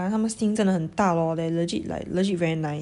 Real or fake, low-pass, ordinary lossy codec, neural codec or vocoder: real; 10.8 kHz; none; none